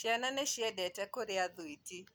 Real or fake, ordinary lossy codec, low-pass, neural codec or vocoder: real; none; none; none